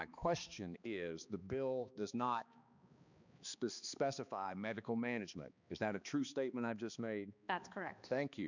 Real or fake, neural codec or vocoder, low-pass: fake; codec, 16 kHz, 2 kbps, X-Codec, HuBERT features, trained on balanced general audio; 7.2 kHz